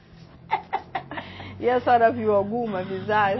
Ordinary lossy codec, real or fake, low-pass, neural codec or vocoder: MP3, 24 kbps; real; 7.2 kHz; none